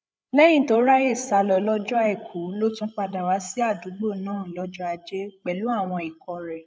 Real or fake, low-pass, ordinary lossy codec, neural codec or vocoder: fake; none; none; codec, 16 kHz, 8 kbps, FreqCodec, larger model